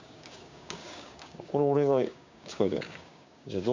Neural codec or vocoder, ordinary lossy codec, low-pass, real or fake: codec, 16 kHz, 6 kbps, DAC; MP3, 64 kbps; 7.2 kHz; fake